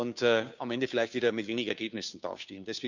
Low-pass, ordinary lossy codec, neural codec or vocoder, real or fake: 7.2 kHz; none; codec, 16 kHz, 2 kbps, FunCodec, trained on Chinese and English, 25 frames a second; fake